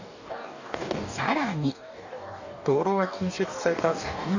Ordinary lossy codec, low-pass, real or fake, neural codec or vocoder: none; 7.2 kHz; fake; codec, 44.1 kHz, 2.6 kbps, DAC